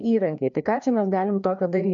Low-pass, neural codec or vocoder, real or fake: 7.2 kHz; codec, 16 kHz, 2 kbps, FreqCodec, larger model; fake